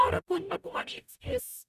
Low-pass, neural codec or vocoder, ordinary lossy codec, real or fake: 14.4 kHz; codec, 44.1 kHz, 0.9 kbps, DAC; none; fake